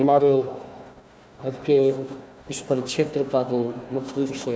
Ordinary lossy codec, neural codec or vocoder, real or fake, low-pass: none; codec, 16 kHz, 1 kbps, FunCodec, trained on Chinese and English, 50 frames a second; fake; none